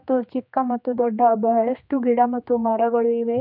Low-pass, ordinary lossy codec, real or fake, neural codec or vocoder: 5.4 kHz; none; fake; codec, 16 kHz, 2 kbps, X-Codec, HuBERT features, trained on general audio